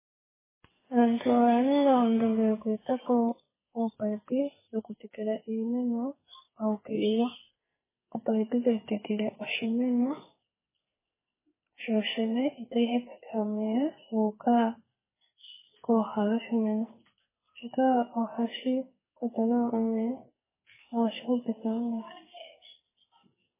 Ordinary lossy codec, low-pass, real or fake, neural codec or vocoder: MP3, 16 kbps; 3.6 kHz; fake; codec, 44.1 kHz, 2.6 kbps, SNAC